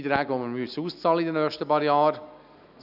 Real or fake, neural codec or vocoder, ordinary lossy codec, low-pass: real; none; none; 5.4 kHz